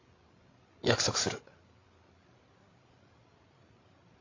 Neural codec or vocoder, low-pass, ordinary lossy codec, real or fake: codec, 16 kHz, 16 kbps, FreqCodec, larger model; 7.2 kHz; AAC, 32 kbps; fake